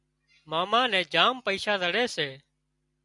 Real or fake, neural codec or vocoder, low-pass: real; none; 10.8 kHz